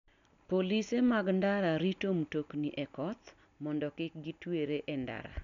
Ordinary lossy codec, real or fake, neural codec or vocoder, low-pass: none; real; none; 7.2 kHz